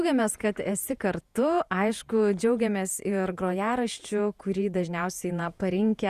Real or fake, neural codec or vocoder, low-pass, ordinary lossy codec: fake; vocoder, 48 kHz, 128 mel bands, Vocos; 14.4 kHz; Opus, 64 kbps